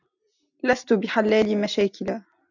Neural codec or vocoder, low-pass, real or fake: none; 7.2 kHz; real